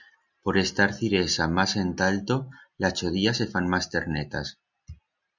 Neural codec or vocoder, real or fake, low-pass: none; real; 7.2 kHz